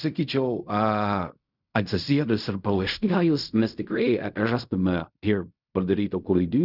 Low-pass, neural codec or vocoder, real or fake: 5.4 kHz; codec, 16 kHz in and 24 kHz out, 0.4 kbps, LongCat-Audio-Codec, fine tuned four codebook decoder; fake